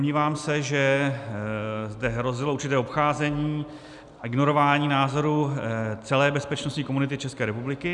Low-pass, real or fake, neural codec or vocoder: 10.8 kHz; real; none